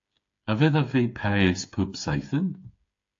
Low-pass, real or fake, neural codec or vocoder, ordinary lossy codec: 7.2 kHz; fake; codec, 16 kHz, 8 kbps, FreqCodec, smaller model; AAC, 48 kbps